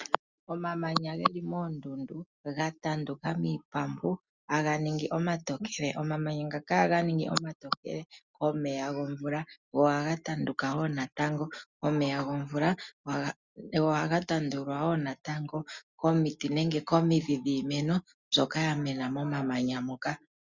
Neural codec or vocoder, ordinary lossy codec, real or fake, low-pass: none; Opus, 64 kbps; real; 7.2 kHz